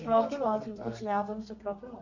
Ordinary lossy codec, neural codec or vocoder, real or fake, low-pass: none; codec, 32 kHz, 1.9 kbps, SNAC; fake; 7.2 kHz